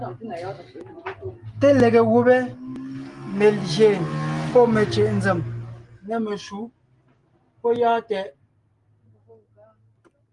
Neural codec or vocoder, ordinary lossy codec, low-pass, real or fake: none; Opus, 24 kbps; 10.8 kHz; real